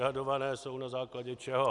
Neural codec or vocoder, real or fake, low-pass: none; real; 10.8 kHz